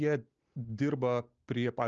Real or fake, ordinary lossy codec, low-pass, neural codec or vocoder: real; Opus, 24 kbps; 7.2 kHz; none